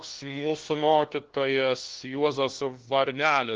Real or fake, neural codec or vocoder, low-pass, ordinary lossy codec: fake; codec, 16 kHz, 1 kbps, FunCodec, trained on LibriTTS, 50 frames a second; 7.2 kHz; Opus, 16 kbps